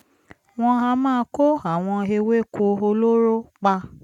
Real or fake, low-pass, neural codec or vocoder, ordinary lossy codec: real; 19.8 kHz; none; none